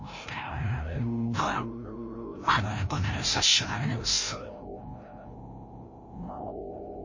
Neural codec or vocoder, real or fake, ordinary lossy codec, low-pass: codec, 16 kHz, 0.5 kbps, FreqCodec, larger model; fake; MP3, 32 kbps; 7.2 kHz